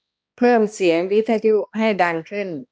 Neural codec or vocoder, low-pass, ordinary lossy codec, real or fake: codec, 16 kHz, 1 kbps, X-Codec, HuBERT features, trained on balanced general audio; none; none; fake